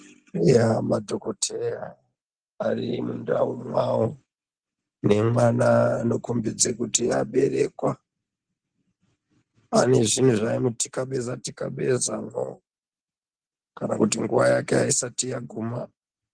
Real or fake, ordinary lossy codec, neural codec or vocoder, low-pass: real; Opus, 24 kbps; none; 9.9 kHz